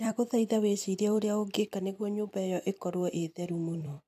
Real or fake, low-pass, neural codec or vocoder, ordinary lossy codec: fake; 14.4 kHz; vocoder, 44.1 kHz, 128 mel bands every 256 samples, BigVGAN v2; AAC, 96 kbps